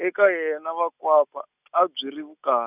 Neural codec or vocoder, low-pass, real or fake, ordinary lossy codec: none; 3.6 kHz; real; none